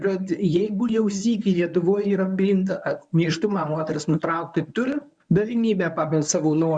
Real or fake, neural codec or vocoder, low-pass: fake; codec, 24 kHz, 0.9 kbps, WavTokenizer, medium speech release version 1; 9.9 kHz